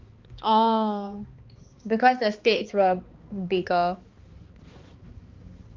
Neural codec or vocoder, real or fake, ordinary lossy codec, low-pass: codec, 16 kHz, 2 kbps, X-Codec, HuBERT features, trained on balanced general audio; fake; Opus, 24 kbps; 7.2 kHz